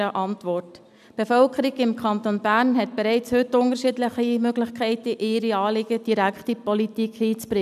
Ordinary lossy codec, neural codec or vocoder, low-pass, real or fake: none; none; 14.4 kHz; real